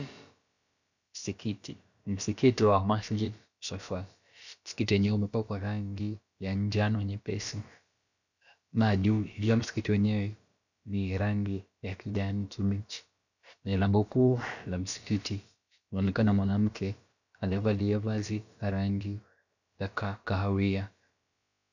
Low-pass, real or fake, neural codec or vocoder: 7.2 kHz; fake; codec, 16 kHz, about 1 kbps, DyCAST, with the encoder's durations